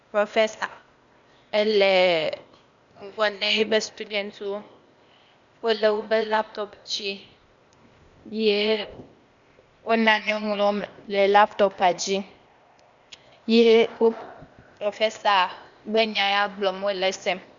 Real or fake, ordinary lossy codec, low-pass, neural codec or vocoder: fake; Opus, 64 kbps; 7.2 kHz; codec, 16 kHz, 0.8 kbps, ZipCodec